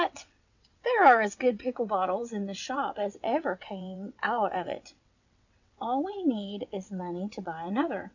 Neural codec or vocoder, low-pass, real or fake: vocoder, 22.05 kHz, 80 mel bands, WaveNeXt; 7.2 kHz; fake